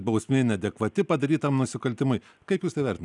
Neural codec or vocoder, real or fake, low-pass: none; real; 10.8 kHz